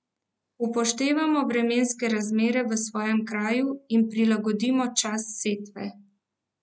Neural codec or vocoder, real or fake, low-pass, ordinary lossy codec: none; real; none; none